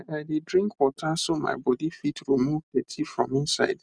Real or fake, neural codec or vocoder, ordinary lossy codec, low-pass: real; none; none; 9.9 kHz